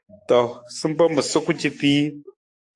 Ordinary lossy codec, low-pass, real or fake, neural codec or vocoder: AAC, 48 kbps; 10.8 kHz; fake; codec, 44.1 kHz, 7.8 kbps, DAC